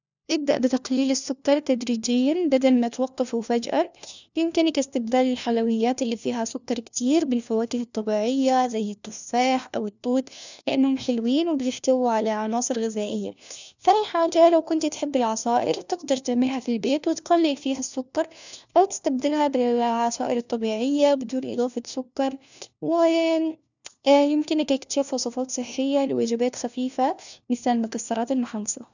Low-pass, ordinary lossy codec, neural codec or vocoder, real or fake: 7.2 kHz; none; codec, 16 kHz, 1 kbps, FunCodec, trained on LibriTTS, 50 frames a second; fake